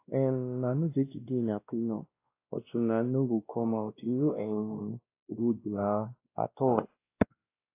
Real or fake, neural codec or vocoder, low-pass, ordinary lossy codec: fake; codec, 16 kHz, 1 kbps, X-Codec, WavLM features, trained on Multilingual LibriSpeech; 3.6 kHz; AAC, 24 kbps